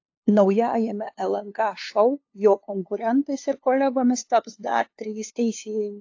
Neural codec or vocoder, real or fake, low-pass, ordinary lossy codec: codec, 16 kHz, 2 kbps, FunCodec, trained on LibriTTS, 25 frames a second; fake; 7.2 kHz; AAC, 48 kbps